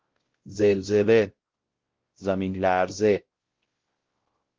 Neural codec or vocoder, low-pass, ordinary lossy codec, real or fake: codec, 16 kHz, 0.5 kbps, X-Codec, WavLM features, trained on Multilingual LibriSpeech; 7.2 kHz; Opus, 16 kbps; fake